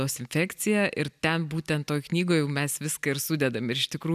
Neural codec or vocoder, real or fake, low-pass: none; real; 14.4 kHz